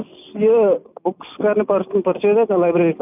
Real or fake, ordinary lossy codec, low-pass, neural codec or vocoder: real; none; 3.6 kHz; none